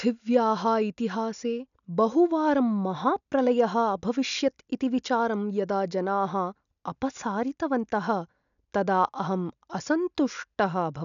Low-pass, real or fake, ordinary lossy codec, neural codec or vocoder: 7.2 kHz; real; none; none